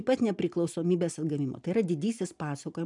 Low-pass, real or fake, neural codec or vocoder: 10.8 kHz; real; none